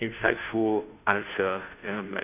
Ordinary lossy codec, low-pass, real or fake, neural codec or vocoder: none; 3.6 kHz; fake; codec, 16 kHz, 0.5 kbps, FunCodec, trained on Chinese and English, 25 frames a second